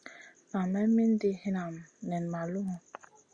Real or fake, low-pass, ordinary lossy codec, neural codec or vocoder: real; 9.9 kHz; Opus, 64 kbps; none